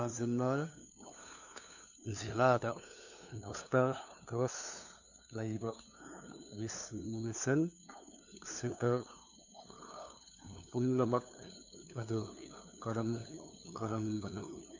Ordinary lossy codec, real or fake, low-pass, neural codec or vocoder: none; fake; 7.2 kHz; codec, 16 kHz, 2 kbps, FunCodec, trained on LibriTTS, 25 frames a second